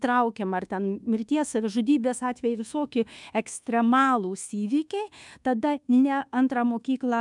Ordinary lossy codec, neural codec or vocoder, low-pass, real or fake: MP3, 96 kbps; codec, 24 kHz, 1.2 kbps, DualCodec; 10.8 kHz; fake